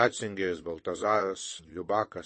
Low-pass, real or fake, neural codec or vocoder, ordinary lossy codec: 9.9 kHz; fake; vocoder, 22.05 kHz, 80 mel bands, WaveNeXt; MP3, 32 kbps